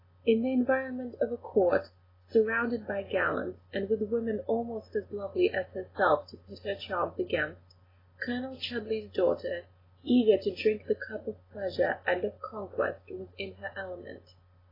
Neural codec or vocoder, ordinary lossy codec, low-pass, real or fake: none; AAC, 24 kbps; 5.4 kHz; real